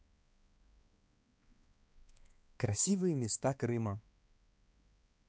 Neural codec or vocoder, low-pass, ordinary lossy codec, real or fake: codec, 16 kHz, 2 kbps, X-Codec, HuBERT features, trained on balanced general audio; none; none; fake